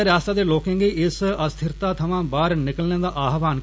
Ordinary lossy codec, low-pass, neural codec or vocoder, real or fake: none; none; none; real